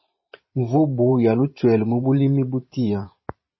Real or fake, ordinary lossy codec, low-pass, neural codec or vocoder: fake; MP3, 24 kbps; 7.2 kHz; vocoder, 44.1 kHz, 128 mel bands every 512 samples, BigVGAN v2